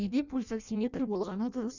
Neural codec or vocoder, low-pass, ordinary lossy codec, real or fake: codec, 16 kHz in and 24 kHz out, 0.6 kbps, FireRedTTS-2 codec; 7.2 kHz; none; fake